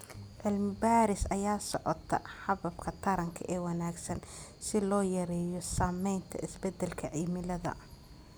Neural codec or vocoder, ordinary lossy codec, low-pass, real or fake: none; none; none; real